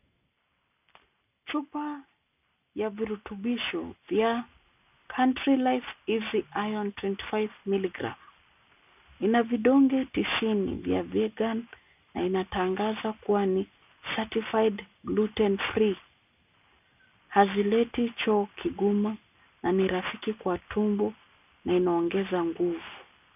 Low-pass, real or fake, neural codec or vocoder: 3.6 kHz; real; none